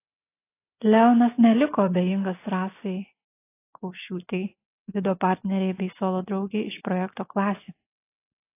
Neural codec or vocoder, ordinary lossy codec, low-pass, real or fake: none; AAC, 24 kbps; 3.6 kHz; real